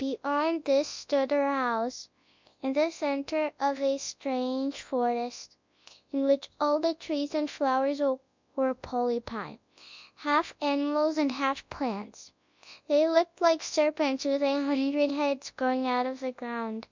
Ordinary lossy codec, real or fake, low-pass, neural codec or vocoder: MP3, 64 kbps; fake; 7.2 kHz; codec, 24 kHz, 0.9 kbps, WavTokenizer, large speech release